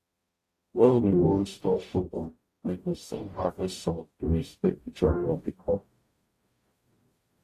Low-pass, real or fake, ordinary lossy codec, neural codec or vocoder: 14.4 kHz; fake; AAC, 48 kbps; codec, 44.1 kHz, 0.9 kbps, DAC